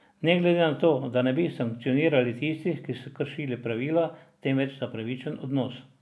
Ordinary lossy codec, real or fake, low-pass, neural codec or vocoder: none; real; none; none